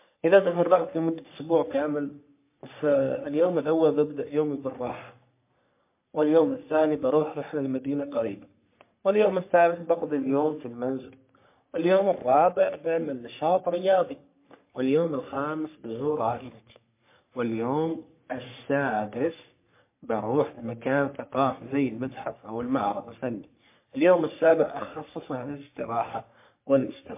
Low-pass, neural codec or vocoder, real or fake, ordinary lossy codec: 3.6 kHz; codec, 44.1 kHz, 3.4 kbps, Pupu-Codec; fake; MP3, 24 kbps